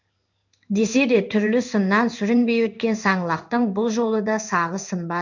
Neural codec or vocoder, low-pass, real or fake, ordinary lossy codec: codec, 16 kHz in and 24 kHz out, 1 kbps, XY-Tokenizer; 7.2 kHz; fake; none